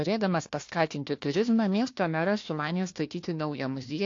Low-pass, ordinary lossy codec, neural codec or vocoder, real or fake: 7.2 kHz; AAC, 48 kbps; codec, 16 kHz, 1 kbps, FunCodec, trained on Chinese and English, 50 frames a second; fake